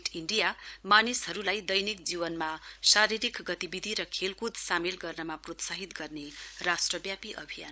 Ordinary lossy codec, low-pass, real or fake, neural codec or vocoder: none; none; fake; codec, 16 kHz, 16 kbps, FunCodec, trained on LibriTTS, 50 frames a second